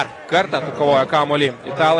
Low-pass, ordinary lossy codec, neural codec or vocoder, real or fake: 10.8 kHz; AAC, 32 kbps; none; real